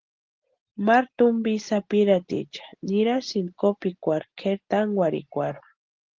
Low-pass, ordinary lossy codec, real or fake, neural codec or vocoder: 7.2 kHz; Opus, 16 kbps; real; none